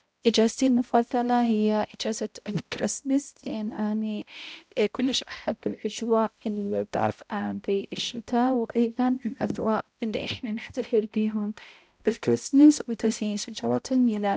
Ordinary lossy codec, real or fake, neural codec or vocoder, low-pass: none; fake; codec, 16 kHz, 0.5 kbps, X-Codec, HuBERT features, trained on balanced general audio; none